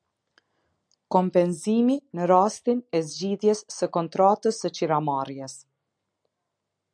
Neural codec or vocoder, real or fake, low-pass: none; real; 9.9 kHz